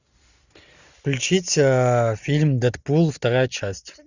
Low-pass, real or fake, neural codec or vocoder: 7.2 kHz; real; none